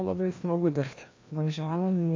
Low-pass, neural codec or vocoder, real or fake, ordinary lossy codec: 7.2 kHz; codec, 16 kHz, 1 kbps, FreqCodec, larger model; fake; MP3, 48 kbps